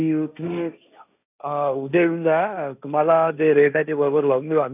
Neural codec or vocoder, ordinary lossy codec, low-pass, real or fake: codec, 16 kHz, 1.1 kbps, Voila-Tokenizer; none; 3.6 kHz; fake